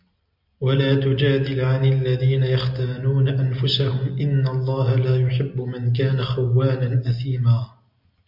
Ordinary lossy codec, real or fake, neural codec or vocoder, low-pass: MP3, 48 kbps; real; none; 5.4 kHz